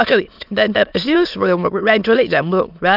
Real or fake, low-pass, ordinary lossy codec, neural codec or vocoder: fake; 5.4 kHz; none; autoencoder, 22.05 kHz, a latent of 192 numbers a frame, VITS, trained on many speakers